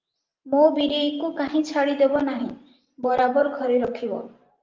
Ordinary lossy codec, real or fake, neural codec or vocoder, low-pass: Opus, 24 kbps; fake; vocoder, 44.1 kHz, 128 mel bands every 512 samples, BigVGAN v2; 7.2 kHz